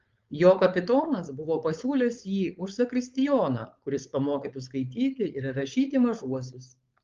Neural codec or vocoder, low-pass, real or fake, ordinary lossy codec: codec, 16 kHz, 4.8 kbps, FACodec; 7.2 kHz; fake; Opus, 32 kbps